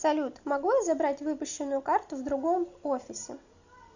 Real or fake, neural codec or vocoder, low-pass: real; none; 7.2 kHz